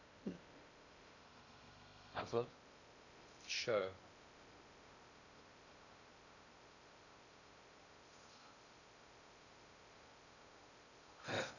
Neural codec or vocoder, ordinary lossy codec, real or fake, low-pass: codec, 16 kHz in and 24 kHz out, 0.6 kbps, FocalCodec, streaming, 2048 codes; none; fake; 7.2 kHz